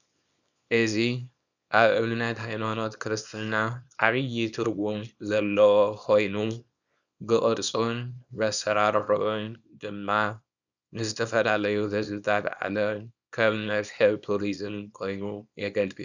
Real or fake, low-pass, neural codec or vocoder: fake; 7.2 kHz; codec, 24 kHz, 0.9 kbps, WavTokenizer, small release